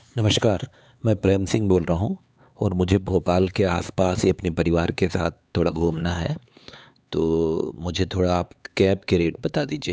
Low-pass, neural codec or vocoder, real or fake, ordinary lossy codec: none; codec, 16 kHz, 4 kbps, X-Codec, HuBERT features, trained on LibriSpeech; fake; none